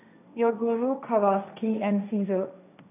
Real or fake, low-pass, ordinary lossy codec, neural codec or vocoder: fake; 3.6 kHz; none; codec, 16 kHz, 1.1 kbps, Voila-Tokenizer